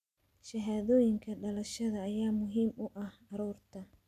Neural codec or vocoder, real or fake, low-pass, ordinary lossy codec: none; real; 14.4 kHz; none